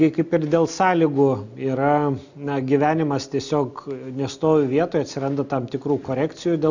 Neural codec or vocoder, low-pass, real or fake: none; 7.2 kHz; real